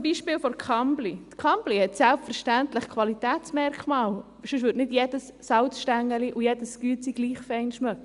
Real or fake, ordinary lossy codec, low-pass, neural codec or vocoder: real; Opus, 64 kbps; 10.8 kHz; none